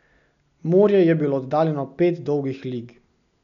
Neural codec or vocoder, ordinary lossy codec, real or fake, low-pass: none; none; real; 7.2 kHz